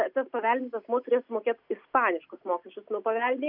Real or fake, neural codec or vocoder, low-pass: real; none; 5.4 kHz